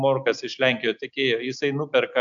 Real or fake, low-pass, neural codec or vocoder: real; 7.2 kHz; none